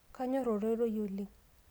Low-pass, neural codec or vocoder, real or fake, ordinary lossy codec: none; none; real; none